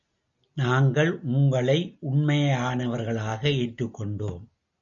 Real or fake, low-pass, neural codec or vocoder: real; 7.2 kHz; none